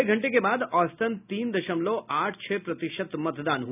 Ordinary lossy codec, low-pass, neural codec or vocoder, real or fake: none; 3.6 kHz; none; real